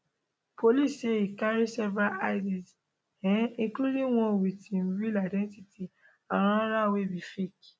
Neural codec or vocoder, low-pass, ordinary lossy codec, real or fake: none; none; none; real